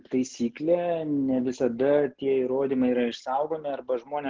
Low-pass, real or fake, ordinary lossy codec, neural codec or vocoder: 7.2 kHz; real; Opus, 16 kbps; none